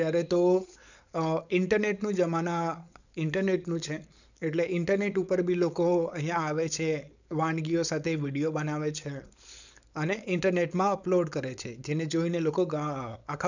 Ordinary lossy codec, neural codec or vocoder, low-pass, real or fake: none; codec, 16 kHz, 4.8 kbps, FACodec; 7.2 kHz; fake